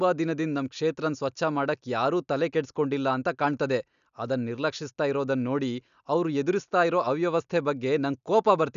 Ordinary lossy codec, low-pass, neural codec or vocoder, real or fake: none; 7.2 kHz; none; real